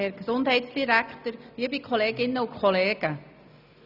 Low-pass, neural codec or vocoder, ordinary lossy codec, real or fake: 5.4 kHz; none; none; real